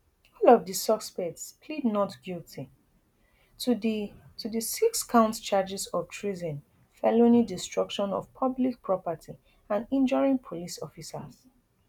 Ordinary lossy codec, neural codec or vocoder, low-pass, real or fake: none; none; 19.8 kHz; real